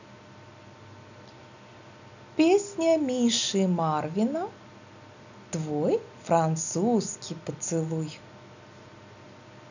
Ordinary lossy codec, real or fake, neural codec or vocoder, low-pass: none; real; none; 7.2 kHz